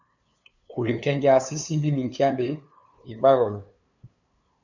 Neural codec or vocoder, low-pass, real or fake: codec, 16 kHz, 2 kbps, FunCodec, trained on LibriTTS, 25 frames a second; 7.2 kHz; fake